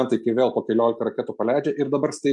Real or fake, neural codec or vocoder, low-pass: real; none; 10.8 kHz